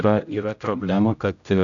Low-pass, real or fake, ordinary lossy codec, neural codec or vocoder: 7.2 kHz; fake; MP3, 96 kbps; codec, 16 kHz, 0.5 kbps, X-Codec, HuBERT features, trained on general audio